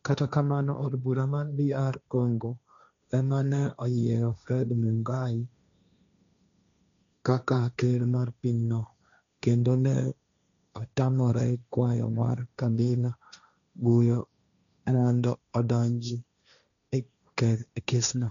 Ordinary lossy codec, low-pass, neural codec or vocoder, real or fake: MP3, 96 kbps; 7.2 kHz; codec, 16 kHz, 1.1 kbps, Voila-Tokenizer; fake